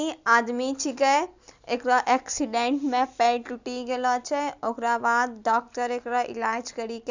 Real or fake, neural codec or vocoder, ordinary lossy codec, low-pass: real; none; Opus, 64 kbps; 7.2 kHz